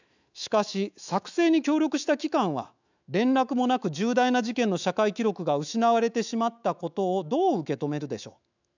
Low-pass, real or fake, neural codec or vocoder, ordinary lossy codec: 7.2 kHz; fake; autoencoder, 48 kHz, 128 numbers a frame, DAC-VAE, trained on Japanese speech; none